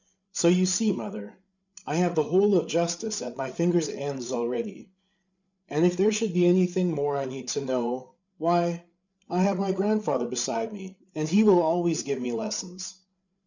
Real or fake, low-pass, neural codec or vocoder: fake; 7.2 kHz; codec, 16 kHz, 8 kbps, FreqCodec, larger model